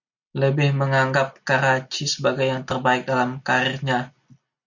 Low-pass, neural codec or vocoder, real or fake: 7.2 kHz; none; real